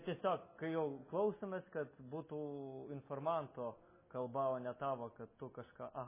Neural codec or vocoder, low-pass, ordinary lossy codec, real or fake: none; 3.6 kHz; MP3, 16 kbps; real